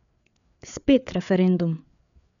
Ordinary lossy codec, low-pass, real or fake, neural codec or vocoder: none; 7.2 kHz; fake; codec, 16 kHz, 4 kbps, FreqCodec, larger model